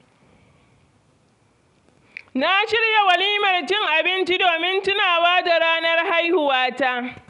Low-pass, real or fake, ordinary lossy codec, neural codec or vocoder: 10.8 kHz; real; none; none